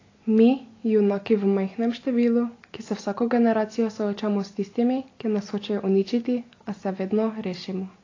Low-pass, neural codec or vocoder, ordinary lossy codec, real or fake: 7.2 kHz; none; AAC, 32 kbps; real